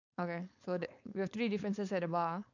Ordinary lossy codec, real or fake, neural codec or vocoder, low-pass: none; fake; codec, 16 kHz, 4.8 kbps, FACodec; 7.2 kHz